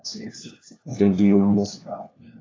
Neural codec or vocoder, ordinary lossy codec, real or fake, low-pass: codec, 16 kHz, 1 kbps, FunCodec, trained on LibriTTS, 50 frames a second; AAC, 32 kbps; fake; 7.2 kHz